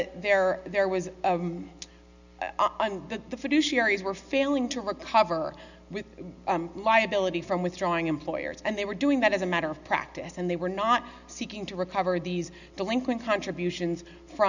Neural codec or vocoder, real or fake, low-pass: none; real; 7.2 kHz